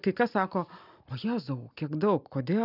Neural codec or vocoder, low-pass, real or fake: none; 5.4 kHz; real